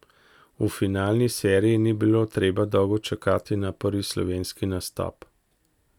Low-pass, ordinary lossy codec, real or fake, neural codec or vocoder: 19.8 kHz; none; real; none